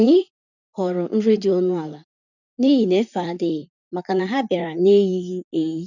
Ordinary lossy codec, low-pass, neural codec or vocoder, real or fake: none; 7.2 kHz; vocoder, 44.1 kHz, 128 mel bands, Pupu-Vocoder; fake